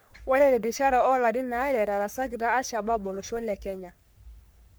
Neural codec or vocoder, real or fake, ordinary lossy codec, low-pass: codec, 44.1 kHz, 3.4 kbps, Pupu-Codec; fake; none; none